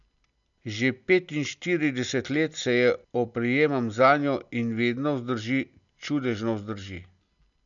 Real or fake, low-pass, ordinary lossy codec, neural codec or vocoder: real; 7.2 kHz; none; none